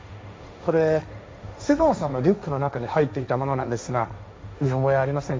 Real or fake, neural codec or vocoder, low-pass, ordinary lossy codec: fake; codec, 16 kHz, 1.1 kbps, Voila-Tokenizer; none; none